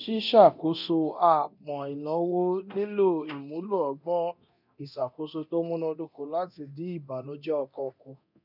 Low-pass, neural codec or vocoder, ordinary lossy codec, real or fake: 5.4 kHz; codec, 24 kHz, 0.9 kbps, DualCodec; none; fake